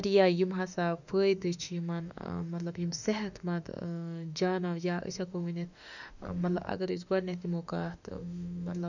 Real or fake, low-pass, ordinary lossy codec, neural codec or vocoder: fake; 7.2 kHz; none; autoencoder, 48 kHz, 32 numbers a frame, DAC-VAE, trained on Japanese speech